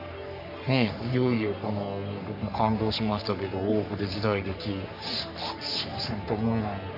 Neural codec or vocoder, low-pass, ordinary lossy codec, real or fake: codec, 44.1 kHz, 3.4 kbps, Pupu-Codec; 5.4 kHz; none; fake